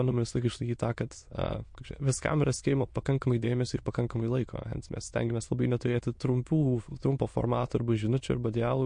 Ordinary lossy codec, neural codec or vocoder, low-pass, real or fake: MP3, 48 kbps; autoencoder, 22.05 kHz, a latent of 192 numbers a frame, VITS, trained on many speakers; 9.9 kHz; fake